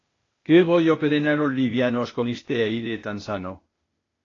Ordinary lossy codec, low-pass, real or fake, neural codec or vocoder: AAC, 32 kbps; 7.2 kHz; fake; codec, 16 kHz, 0.8 kbps, ZipCodec